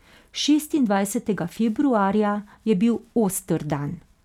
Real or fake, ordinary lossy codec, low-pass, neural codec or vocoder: real; none; 19.8 kHz; none